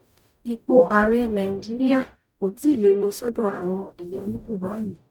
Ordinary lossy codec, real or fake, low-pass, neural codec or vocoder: none; fake; 19.8 kHz; codec, 44.1 kHz, 0.9 kbps, DAC